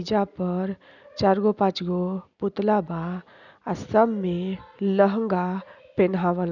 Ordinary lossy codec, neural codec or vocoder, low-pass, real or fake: none; none; 7.2 kHz; real